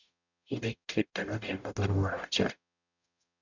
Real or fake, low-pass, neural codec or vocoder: fake; 7.2 kHz; codec, 44.1 kHz, 0.9 kbps, DAC